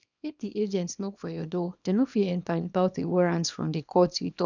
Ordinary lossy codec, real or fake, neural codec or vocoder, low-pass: none; fake; codec, 24 kHz, 0.9 kbps, WavTokenizer, small release; 7.2 kHz